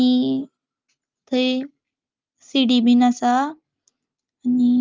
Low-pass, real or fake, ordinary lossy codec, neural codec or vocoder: 7.2 kHz; real; Opus, 24 kbps; none